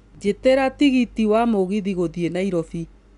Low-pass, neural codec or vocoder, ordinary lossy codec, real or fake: 10.8 kHz; none; none; real